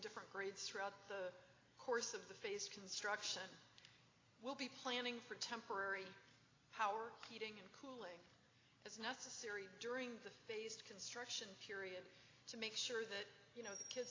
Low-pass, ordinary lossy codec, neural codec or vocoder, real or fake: 7.2 kHz; AAC, 32 kbps; none; real